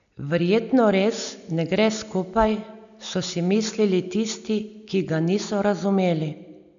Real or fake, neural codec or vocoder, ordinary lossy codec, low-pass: real; none; none; 7.2 kHz